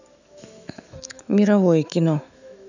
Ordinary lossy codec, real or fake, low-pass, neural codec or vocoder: none; fake; 7.2 kHz; autoencoder, 48 kHz, 128 numbers a frame, DAC-VAE, trained on Japanese speech